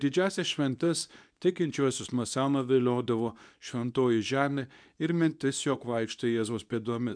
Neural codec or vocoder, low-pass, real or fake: codec, 24 kHz, 0.9 kbps, WavTokenizer, medium speech release version 2; 9.9 kHz; fake